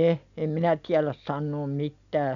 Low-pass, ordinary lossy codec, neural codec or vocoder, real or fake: 7.2 kHz; none; none; real